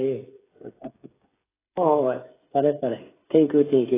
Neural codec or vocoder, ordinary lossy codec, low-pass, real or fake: codec, 16 kHz, 8 kbps, FreqCodec, smaller model; AAC, 16 kbps; 3.6 kHz; fake